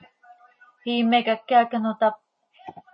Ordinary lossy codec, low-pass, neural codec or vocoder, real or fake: MP3, 32 kbps; 9.9 kHz; none; real